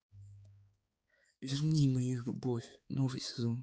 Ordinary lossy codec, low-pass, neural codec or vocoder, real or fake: none; none; codec, 16 kHz, 4 kbps, X-Codec, HuBERT features, trained on balanced general audio; fake